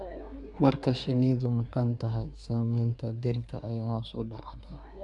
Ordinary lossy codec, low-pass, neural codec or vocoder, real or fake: none; 10.8 kHz; codec, 24 kHz, 1 kbps, SNAC; fake